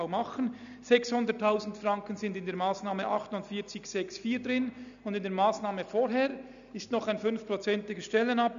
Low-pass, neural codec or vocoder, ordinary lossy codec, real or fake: 7.2 kHz; none; none; real